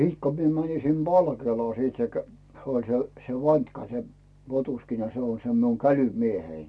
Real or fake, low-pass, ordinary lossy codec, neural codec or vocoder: real; none; none; none